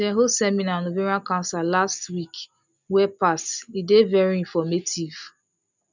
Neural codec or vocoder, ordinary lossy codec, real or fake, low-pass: none; none; real; 7.2 kHz